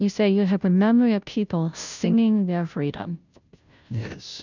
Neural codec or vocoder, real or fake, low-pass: codec, 16 kHz, 0.5 kbps, FunCodec, trained on Chinese and English, 25 frames a second; fake; 7.2 kHz